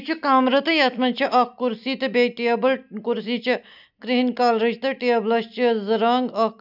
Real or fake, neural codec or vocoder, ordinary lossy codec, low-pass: real; none; none; 5.4 kHz